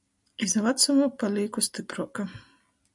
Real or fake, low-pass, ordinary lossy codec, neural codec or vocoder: real; 10.8 kHz; MP3, 96 kbps; none